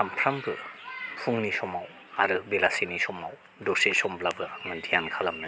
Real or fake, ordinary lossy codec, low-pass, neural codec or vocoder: real; none; none; none